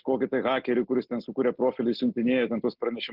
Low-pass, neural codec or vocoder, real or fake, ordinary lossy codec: 5.4 kHz; none; real; Opus, 16 kbps